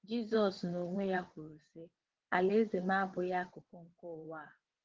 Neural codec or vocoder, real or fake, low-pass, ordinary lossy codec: vocoder, 22.05 kHz, 80 mel bands, WaveNeXt; fake; 7.2 kHz; Opus, 16 kbps